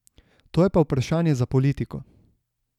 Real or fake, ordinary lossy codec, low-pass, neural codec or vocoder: real; none; 19.8 kHz; none